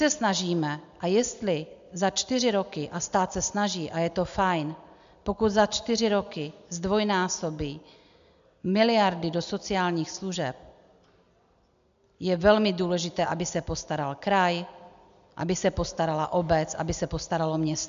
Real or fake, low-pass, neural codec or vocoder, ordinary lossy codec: real; 7.2 kHz; none; MP3, 64 kbps